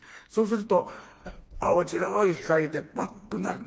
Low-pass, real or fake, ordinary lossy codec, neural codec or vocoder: none; fake; none; codec, 16 kHz, 2 kbps, FreqCodec, smaller model